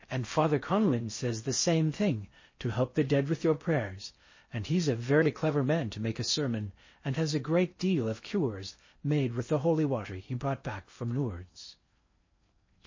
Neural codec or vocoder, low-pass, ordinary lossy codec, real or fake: codec, 16 kHz in and 24 kHz out, 0.6 kbps, FocalCodec, streaming, 4096 codes; 7.2 kHz; MP3, 32 kbps; fake